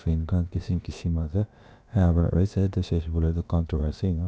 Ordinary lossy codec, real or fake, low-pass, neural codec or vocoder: none; fake; none; codec, 16 kHz, about 1 kbps, DyCAST, with the encoder's durations